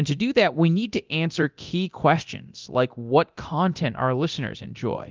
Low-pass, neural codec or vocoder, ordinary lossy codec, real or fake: 7.2 kHz; codec, 24 kHz, 0.9 kbps, DualCodec; Opus, 24 kbps; fake